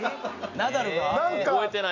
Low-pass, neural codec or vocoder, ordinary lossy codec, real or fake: 7.2 kHz; none; none; real